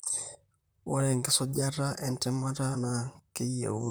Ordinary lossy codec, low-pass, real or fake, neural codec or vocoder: none; none; fake; vocoder, 44.1 kHz, 128 mel bands, Pupu-Vocoder